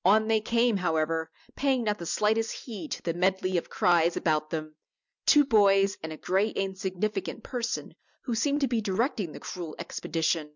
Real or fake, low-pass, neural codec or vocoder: real; 7.2 kHz; none